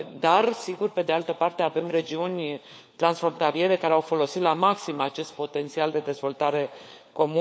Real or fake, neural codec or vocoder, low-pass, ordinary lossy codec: fake; codec, 16 kHz, 4 kbps, FunCodec, trained on LibriTTS, 50 frames a second; none; none